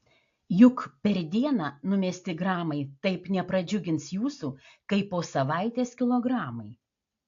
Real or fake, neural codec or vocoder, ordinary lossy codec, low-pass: real; none; AAC, 48 kbps; 7.2 kHz